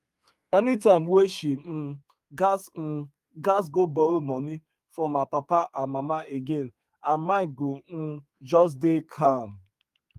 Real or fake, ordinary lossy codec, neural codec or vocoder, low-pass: fake; Opus, 32 kbps; codec, 32 kHz, 1.9 kbps, SNAC; 14.4 kHz